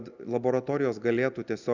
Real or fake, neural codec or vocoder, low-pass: real; none; 7.2 kHz